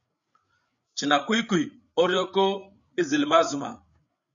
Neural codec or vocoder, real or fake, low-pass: codec, 16 kHz, 8 kbps, FreqCodec, larger model; fake; 7.2 kHz